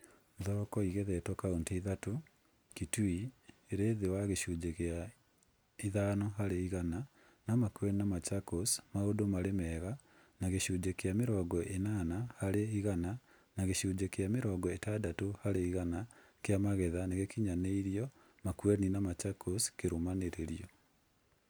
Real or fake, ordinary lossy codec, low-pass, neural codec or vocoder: real; none; none; none